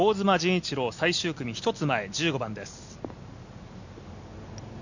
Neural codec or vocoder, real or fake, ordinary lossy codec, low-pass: none; real; none; 7.2 kHz